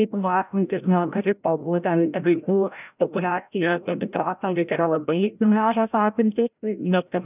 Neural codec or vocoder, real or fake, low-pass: codec, 16 kHz, 0.5 kbps, FreqCodec, larger model; fake; 3.6 kHz